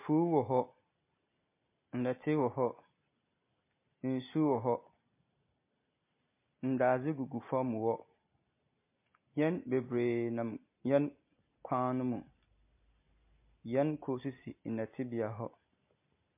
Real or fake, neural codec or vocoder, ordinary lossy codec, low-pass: real; none; MP3, 24 kbps; 3.6 kHz